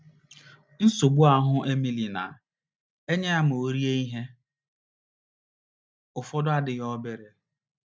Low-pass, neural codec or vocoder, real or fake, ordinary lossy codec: none; none; real; none